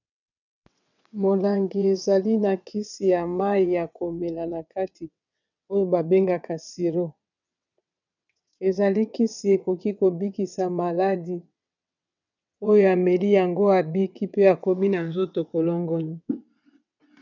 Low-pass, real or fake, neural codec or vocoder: 7.2 kHz; fake; vocoder, 22.05 kHz, 80 mel bands, WaveNeXt